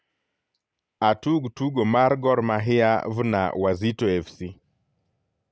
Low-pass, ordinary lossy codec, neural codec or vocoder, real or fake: none; none; none; real